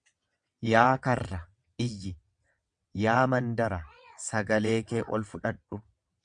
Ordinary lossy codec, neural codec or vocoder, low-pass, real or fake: MP3, 96 kbps; vocoder, 22.05 kHz, 80 mel bands, WaveNeXt; 9.9 kHz; fake